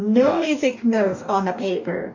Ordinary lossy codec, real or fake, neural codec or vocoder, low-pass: MP3, 64 kbps; fake; codec, 44.1 kHz, 2.6 kbps, DAC; 7.2 kHz